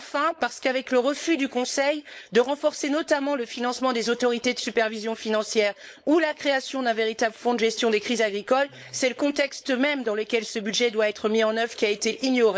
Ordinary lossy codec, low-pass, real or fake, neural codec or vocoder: none; none; fake; codec, 16 kHz, 4.8 kbps, FACodec